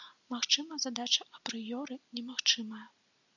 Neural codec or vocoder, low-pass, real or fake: none; 7.2 kHz; real